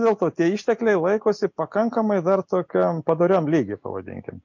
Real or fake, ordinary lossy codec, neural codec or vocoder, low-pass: real; MP3, 48 kbps; none; 7.2 kHz